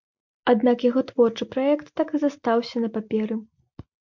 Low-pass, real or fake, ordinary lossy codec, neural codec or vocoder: 7.2 kHz; real; MP3, 64 kbps; none